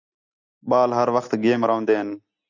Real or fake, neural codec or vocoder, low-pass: real; none; 7.2 kHz